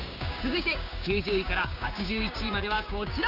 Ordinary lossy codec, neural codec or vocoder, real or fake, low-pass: none; codec, 16 kHz, 6 kbps, DAC; fake; 5.4 kHz